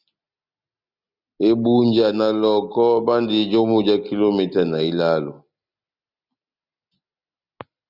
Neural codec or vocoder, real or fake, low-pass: none; real; 5.4 kHz